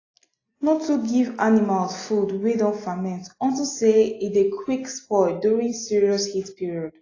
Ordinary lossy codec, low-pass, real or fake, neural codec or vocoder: AAC, 32 kbps; 7.2 kHz; real; none